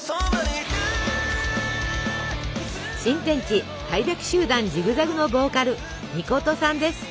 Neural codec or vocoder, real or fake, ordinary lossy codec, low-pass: none; real; none; none